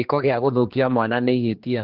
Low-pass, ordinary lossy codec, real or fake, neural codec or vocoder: 5.4 kHz; Opus, 16 kbps; fake; codec, 16 kHz, 2 kbps, X-Codec, HuBERT features, trained on general audio